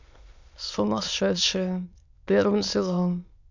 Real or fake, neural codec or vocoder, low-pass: fake; autoencoder, 22.05 kHz, a latent of 192 numbers a frame, VITS, trained on many speakers; 7.2 kHz